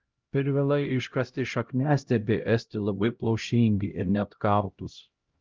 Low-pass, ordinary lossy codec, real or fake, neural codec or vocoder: 7.2 kHz; Opus, 24 kbps; fake; codec, 16 kHz, 0.5 kbps, X-Codec, HuBERT features, trained on LibriSpeech